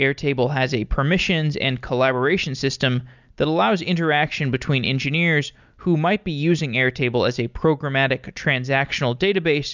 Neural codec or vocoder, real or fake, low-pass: none; real; 7.2 kHz